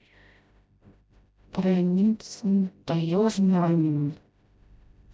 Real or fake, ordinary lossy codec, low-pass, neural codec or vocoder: fake; none; none; codec, 16 kHz, 0.5 kbps, FreqCodec, smaller model